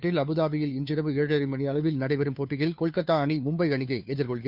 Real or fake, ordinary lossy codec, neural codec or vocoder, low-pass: fake; none; codec, 16 kHz, 2 kbps, FunCodec, trained on Chinese and English, 25 frames a second; 5.4 kHz